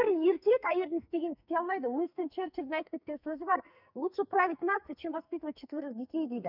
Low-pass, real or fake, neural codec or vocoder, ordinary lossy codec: 5.4 kHz; fake; codec, 44.1 kHz, 2.6 kbps, SNAC; none